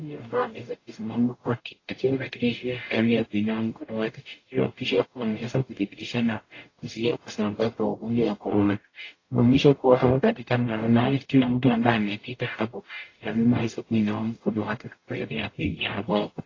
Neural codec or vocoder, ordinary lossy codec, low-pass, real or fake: codec, 44.1 kHz, 0.9 kbps, DAC; AAC, 32 kbps; 7.2 kHz; fake